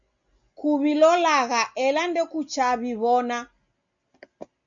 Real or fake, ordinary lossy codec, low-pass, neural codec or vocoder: real; MP3, 64 kbps; 7.2 kHz; none